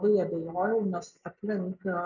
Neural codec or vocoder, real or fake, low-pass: none; real; 7.2 kHz